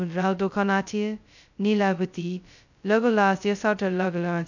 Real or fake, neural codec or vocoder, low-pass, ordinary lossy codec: fake; codec, 16 kHz, 0.2 kbps, FocalCodec; 7.2 kHz; none